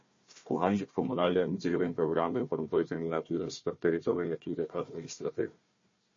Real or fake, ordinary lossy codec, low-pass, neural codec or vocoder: fake; MP3, 32 kbps; 7.2 kHz; codec, 16 kHz, 1 kbps, FunCodec, trained on Chinese and English, 50 frames a second